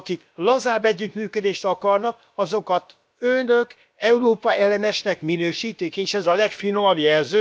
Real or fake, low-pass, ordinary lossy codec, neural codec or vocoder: fake; none; none; codec, 16 kHz, about 1 kbps, DyCAST, with the encoder's durations